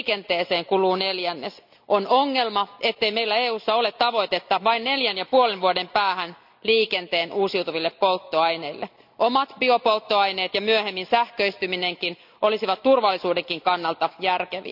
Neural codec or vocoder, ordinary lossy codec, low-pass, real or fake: none; none; 5.4 kHz; real